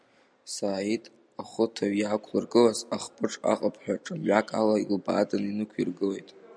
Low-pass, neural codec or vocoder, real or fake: 9.9 kHz; none; real